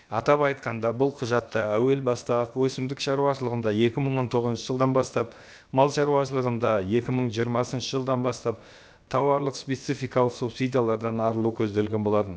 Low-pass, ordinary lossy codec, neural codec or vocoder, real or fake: none; none; codec, 16 kHz, about 1 kbps, DyCAST, with the encoder's durations; fake